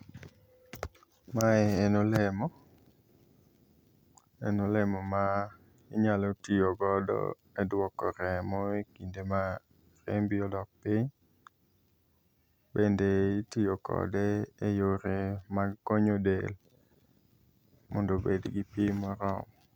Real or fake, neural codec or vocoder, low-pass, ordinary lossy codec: real; none; 19.8 kHz; none